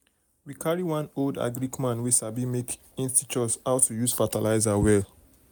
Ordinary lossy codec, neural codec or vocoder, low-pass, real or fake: none; none; none; real